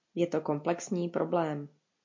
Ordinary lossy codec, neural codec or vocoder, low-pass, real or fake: MP3, 48 kbps; none; 7.2 kHz; real